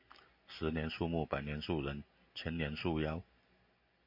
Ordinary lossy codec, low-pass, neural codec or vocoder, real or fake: MP3, 32 kbps; 5.4 kHz; none; real